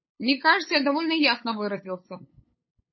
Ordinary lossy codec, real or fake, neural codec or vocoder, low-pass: MP3, 24 kbps; fake; codec, 16 kHz, 8 kbps, FunCodec, trained on LibriTTS, 25 frames a second; 7.2 kHz